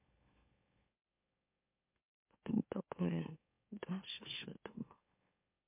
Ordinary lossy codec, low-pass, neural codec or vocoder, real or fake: MP3, 32 kbps; 3.6 kHz; autoencoder, 44.1 kHz, a latent of 192 numbers a frame, MeloTTS; fake